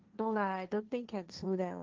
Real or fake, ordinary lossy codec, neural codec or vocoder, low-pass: fake; Opus, 32 kbps; codec, 16 kHz, 1.1 kbps, Voila-Tokenizer; 7.2 kHz